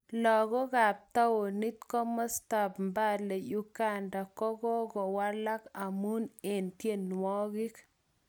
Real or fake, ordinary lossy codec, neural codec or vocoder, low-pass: real; none; none; none